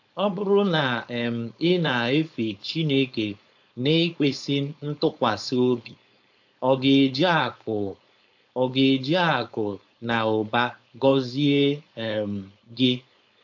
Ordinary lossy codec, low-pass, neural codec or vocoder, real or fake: AAC, 48 kbps; 7.2 kHz; codec, 16 kHz, 4.8 kbps, FACodec; fake